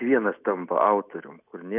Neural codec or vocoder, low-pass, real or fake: none; 3.6 kHz; real